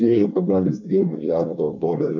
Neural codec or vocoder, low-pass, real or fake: codec, 24 kHz, 1 kbps, SNAC; 7.2 kHz; fake